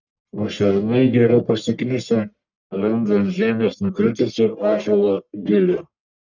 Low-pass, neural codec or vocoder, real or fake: 7.2 kHz; codec, 44.1 kHz, 1.7 kbps, Pupu-Codec; fake